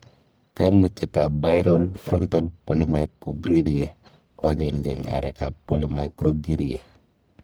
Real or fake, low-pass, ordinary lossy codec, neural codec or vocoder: fake; none; none; codec, 44.1 kHz, 1.7 kbps, Pupu-Codec